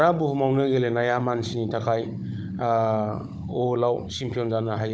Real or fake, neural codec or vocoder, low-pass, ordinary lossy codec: fake; codec, 16 kHz, 16 kbps, FunCodec, trained on Chinese and English, 50 frames a second; none; none